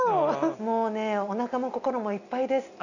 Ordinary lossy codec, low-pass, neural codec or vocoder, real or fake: none; 7.2 kHz; none; real